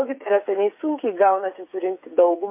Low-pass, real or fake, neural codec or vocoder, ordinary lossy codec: 3.6 kHz; fake; codec, 16 kHz, 8 kbps, FreqCodec, smaller model; MP3, 32 kbps